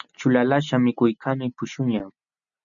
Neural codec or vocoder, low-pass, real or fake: none; 7.2 kHz; real